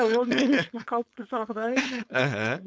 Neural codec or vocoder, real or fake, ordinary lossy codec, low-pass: codec, 16 kHz, 4.8 kbps, FACodec; fake; none; none